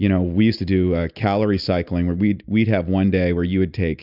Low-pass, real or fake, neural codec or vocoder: 5.4 kHz; real; none